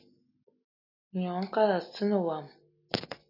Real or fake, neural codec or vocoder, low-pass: real; none; 5.4 kHz